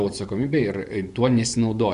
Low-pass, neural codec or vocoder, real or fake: 10.8 kHz; vocoder, 24 kHz, 100 mel bands, Vocos; fake